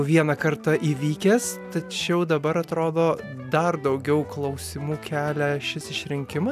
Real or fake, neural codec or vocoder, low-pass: real; none; 14.4 kHz